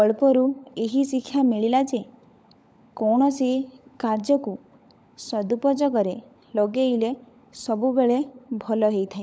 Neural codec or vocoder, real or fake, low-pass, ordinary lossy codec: codec, 16 kHz, 16 kbps, FunCodec, trained on LibriTTS, 50 frames a second; fake; none; none